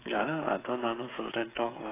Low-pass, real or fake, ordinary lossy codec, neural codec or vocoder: 3.6 kHz; fake; AAC, 16 kbps; codec, 16 kHz, 16 kbps, FreqCodec, smaller model